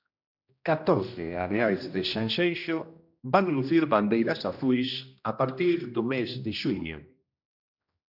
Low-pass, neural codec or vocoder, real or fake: 5.4 kHz; codec, 16 kHz, 1 kbps, X-Codec, HuBERT features, trained on general audio; fake